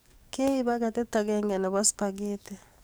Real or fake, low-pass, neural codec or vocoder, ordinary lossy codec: fake; none; codec, 44.1 kHz, 7.8 kbps, DAC; none